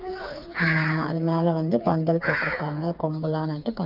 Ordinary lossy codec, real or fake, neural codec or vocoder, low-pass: none; fake; codec, 16 kHz, 4 kbps, FreqCodec, smaller model; 5.4 kHz